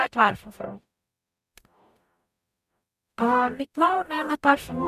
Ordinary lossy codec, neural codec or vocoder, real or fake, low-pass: none; codec, 44.1 kHz, 0.9 kbps, DAC; fake; 14.4 kHz